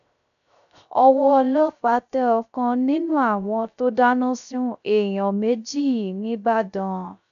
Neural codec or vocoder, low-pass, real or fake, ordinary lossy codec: codec, 16 kHz, 0.3 kbps, FocalCodec; 7.2 kHz; fake; none